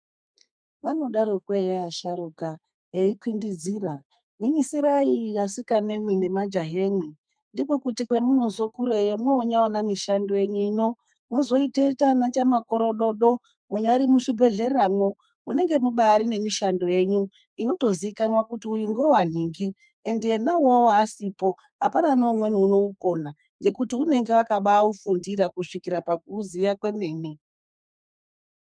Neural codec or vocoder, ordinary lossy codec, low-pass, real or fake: codec, 32 kHz, 1.9 kbps, SNAC; MP3, 96 kbps; 9.9 kHz; fake